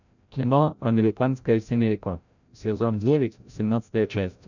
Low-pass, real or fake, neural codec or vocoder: 7.2 kHz; fake; codec, 16 kHz, 0.5 kbps, FreqCodec, larger model